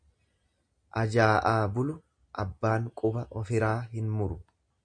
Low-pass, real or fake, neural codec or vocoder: 9.9 kHz; real; none